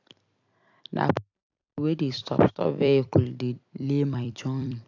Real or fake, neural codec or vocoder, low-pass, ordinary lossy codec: fake; vocoder, 44.1 kHz, 128 mel bands every 256 samples, BigVGAN v2; 7.2 kHz; AAC, 48 kbps